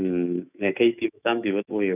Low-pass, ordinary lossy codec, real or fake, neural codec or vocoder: 3.6 kHz; none; fake; autoencoder, 48 kHz, 128 numbers a frame, DAC-VAE, trained on Japanese speech